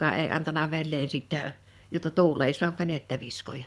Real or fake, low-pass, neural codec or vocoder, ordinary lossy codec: fake; none; codec, 24 kHz, 6 kbps, HILCodec; none